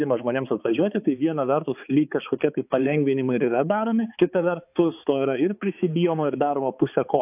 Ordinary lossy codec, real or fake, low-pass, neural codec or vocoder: AAC, 32 kbps; fake; 3.6 kHz; codec, 16 kHz, 4 kbps, X-Codec, HuBERT features, trained on balanced general audio